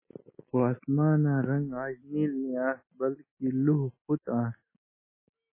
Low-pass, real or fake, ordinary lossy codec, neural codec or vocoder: 3.6 kHz; real; MP3, 16 kbps; none